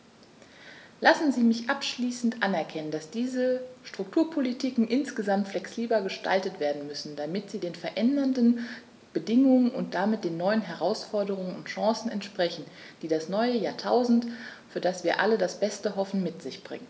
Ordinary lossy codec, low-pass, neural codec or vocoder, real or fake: none; none; none; real